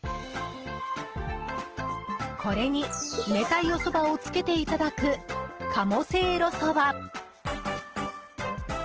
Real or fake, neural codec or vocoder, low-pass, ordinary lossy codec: real; none; 7.2 kHz; Opus, 16 kbps